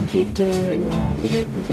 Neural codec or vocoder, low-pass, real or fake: codec, 44.1 kHz, 0.9 kbps, DAC; 14.4 kHz; fake